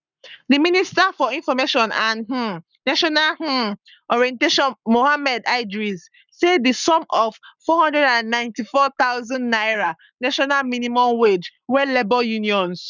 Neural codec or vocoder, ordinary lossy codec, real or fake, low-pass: codec, 44.1 kHz, 7.8 kbps, Pupu-Codec; none; fake; 7.2 kHz